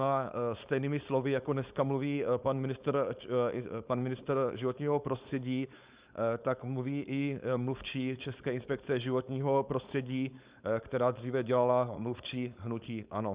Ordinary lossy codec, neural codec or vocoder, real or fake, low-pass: Opus, 64 kbps; codec, 16 kHz, 4.8 kbps, FACodec; fake; 3.6 kHz